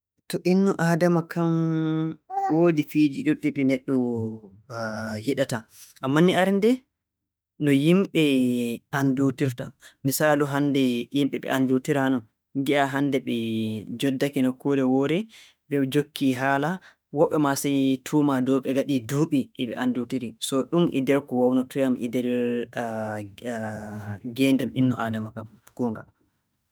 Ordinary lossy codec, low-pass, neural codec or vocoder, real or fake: none; none; autoencoder, 48 kHz, 32 numbers a frame, DAC-VAE, trained on Japanese speech; fake